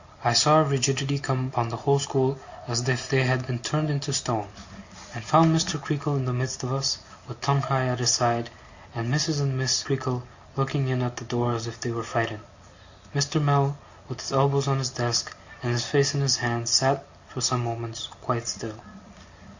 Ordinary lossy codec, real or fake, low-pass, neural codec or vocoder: Opus, 64 kbps; real; 7.2 kHz; none